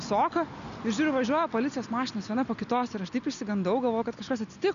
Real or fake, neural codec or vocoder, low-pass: real; none; 7.2 kHz